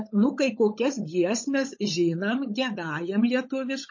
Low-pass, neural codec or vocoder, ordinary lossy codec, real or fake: 7.2 kHz; codec, 16 kHz, 16 kbps, FunCodec, trained on LibriTTS, 50 frames a second; MP3, 32 kbps; fake